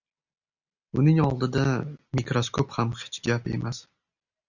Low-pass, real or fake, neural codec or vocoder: 7.2 kHz; real; none